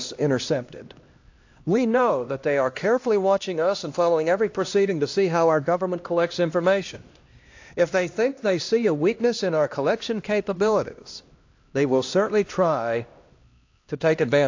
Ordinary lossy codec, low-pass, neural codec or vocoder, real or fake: AAC, 48 kbps; 7.2 kHz; codec, 16 kHz, 1 kbps, X-Codec, HuBERT features, trained on LibriSpeech; fake